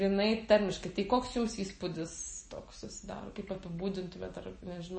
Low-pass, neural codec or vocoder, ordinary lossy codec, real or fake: 9.9 kHz; none; MP3, 32 kbps; real